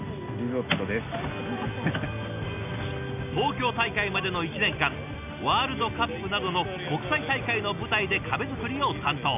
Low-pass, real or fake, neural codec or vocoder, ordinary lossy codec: 3.6 kHz; real; none; none